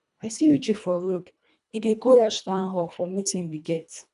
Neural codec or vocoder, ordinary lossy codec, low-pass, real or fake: codec, 24 kHz, 1.5 kbps, HILCodec; none; 10.8 kHz; fake